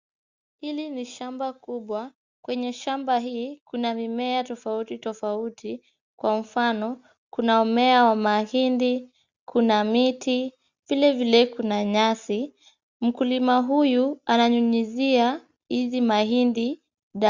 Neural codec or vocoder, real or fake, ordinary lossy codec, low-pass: none; real; Opus, 64 kbps; 7.2 kHz